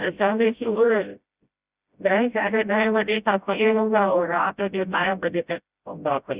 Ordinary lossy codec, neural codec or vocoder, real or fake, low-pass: Opus, 64 kbps; codec, 16 kHz, 0.5 kbps, FreqCodec, smaller model; fake; 3.6 kHz